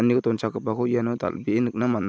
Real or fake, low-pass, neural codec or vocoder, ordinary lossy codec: real; none; none; none